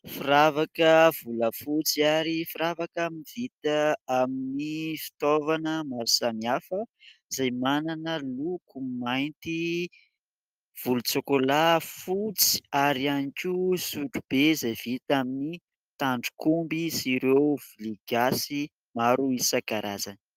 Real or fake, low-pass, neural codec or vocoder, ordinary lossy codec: real; 14.4 kHz; none; Opus, 32 kbps